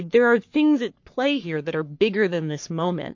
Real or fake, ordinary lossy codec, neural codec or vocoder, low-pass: fake; MP3, 48 kbps; codec, 44.1 kHz, 3.4 kbps, Pupu-Codec; 7.2 kHz